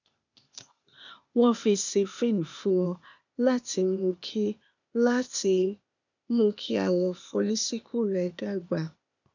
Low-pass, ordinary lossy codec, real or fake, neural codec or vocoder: 7.2 kHz; none; fake; codec, 16 kHz, 0.8 kbps, ZipCodec